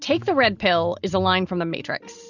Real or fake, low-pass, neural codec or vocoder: real; 7.2 kHz; none